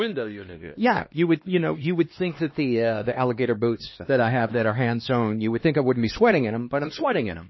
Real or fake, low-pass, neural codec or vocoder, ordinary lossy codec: fake; 7.2 kHz; codec, 16 kHz, 2 kbps, X-Codec, HuBERT features, trained on LibriSpeech; MP3, 24 kbps